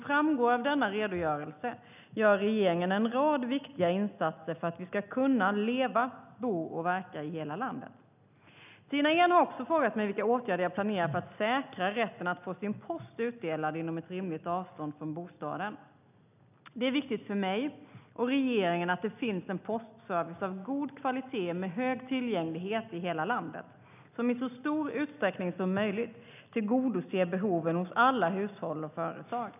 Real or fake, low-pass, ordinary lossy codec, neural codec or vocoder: real; 3.6 kHz; none; none